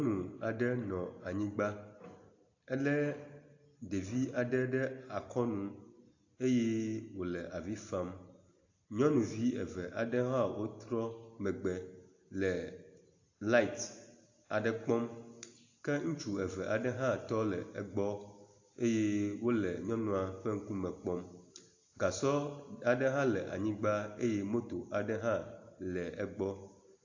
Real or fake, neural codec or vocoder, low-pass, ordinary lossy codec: real; none; 7.2 kHz; AAC, 48 kbps